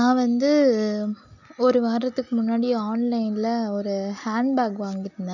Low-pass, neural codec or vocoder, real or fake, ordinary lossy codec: 7.2 kHz; none; real; none